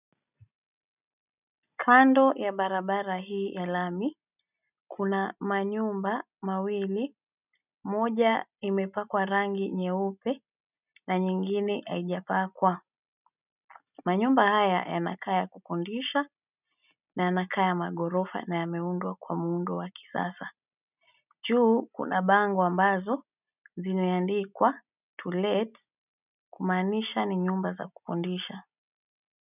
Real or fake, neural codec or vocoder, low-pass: real; none; 3.6 kHz